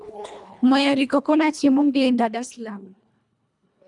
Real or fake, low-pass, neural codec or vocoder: fake; 10.8 kHz; codec, 24 kHz, 1.5 kbps, HILCodec